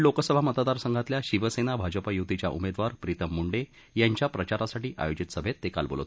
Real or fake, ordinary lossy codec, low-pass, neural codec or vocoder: real; none; none; none